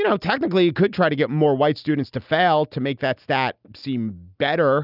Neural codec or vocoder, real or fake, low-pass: none; real; 5.4 kHz